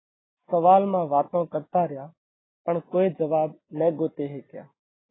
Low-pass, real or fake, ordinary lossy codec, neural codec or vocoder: 7.2 kHz; real; AAC, 16 kbps; none